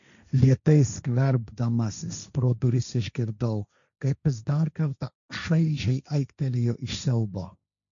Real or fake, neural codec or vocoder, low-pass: fake; codec, 16 kHz, 1.1 kbps, Voila-Tokenizer; 7.2 kHz